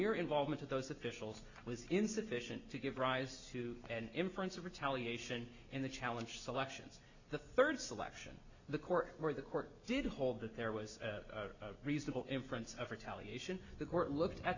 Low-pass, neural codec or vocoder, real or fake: 7.2 kHz; none; real